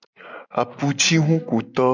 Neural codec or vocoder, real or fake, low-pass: none; real; 7.2 kHz